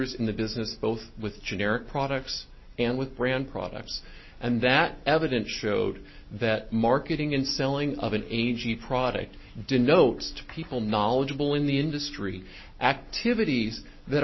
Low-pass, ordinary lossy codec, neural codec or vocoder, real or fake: 7.2 kHz; MP3, 24 kbps; none; real